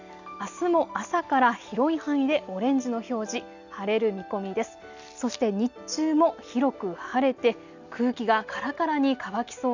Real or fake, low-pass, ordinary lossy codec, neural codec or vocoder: real; 7.2 kHz; none; none